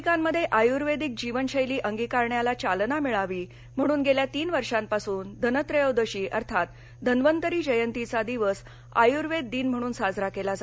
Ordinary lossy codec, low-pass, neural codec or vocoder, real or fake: none; none; none; real